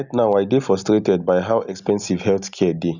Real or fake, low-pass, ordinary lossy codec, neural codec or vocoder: real; 7.2 kHz; none; none